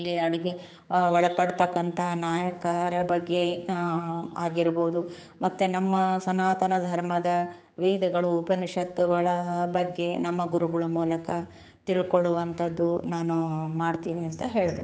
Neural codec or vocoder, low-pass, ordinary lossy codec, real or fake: codec, 16 kHz, 4 kbps, X-Codec, HuBERT features, trained on general audio; none; none; fake